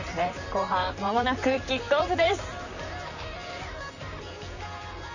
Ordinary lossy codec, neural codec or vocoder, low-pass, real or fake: none; vocoder, 44.1 kHz, 128 mel bands, Pupu-Vocoder; 7.2 kHz; fake